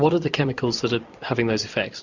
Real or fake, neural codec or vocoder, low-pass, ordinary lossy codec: fake; vocoder, 44.1 kHz, 128 mel bands every 256 samples, BigVGAN v2; 7.2 kHz; Opus, 64 kbps